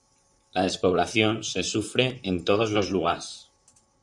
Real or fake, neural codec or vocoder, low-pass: fake; vocoder, 44.1 kHz, 128 mel bands, Pupu-Vocoder; 10.8 kHz